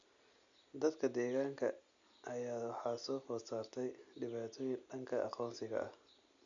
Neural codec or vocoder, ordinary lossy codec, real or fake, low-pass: none; none; real; 7.2 kHz